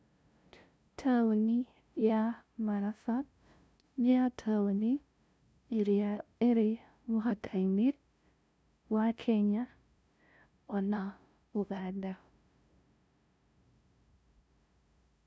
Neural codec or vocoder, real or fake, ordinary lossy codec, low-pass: codec, 16 kHz, 0.5 kbps, FunCodec, trained on LibriTTS, 25 frames a second; fake; none; none